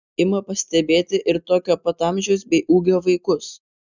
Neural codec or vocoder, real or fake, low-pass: none; real; 7.2 kHz